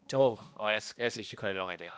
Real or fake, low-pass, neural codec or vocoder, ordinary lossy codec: fake; none; codec, 16 kHz, 1 kbps, X-Codec, HuBERT features, trained on balanced general audio; none